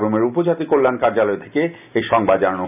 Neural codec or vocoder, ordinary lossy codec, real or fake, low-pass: none; none; real; 3.6 kHz